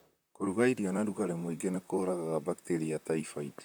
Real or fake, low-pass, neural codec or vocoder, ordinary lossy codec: fake; none; vocoder, 44.1 kHz, 128 mel bands, Pupu-Vocoder; none